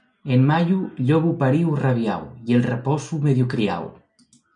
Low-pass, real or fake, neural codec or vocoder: 9.9 kHz; real; none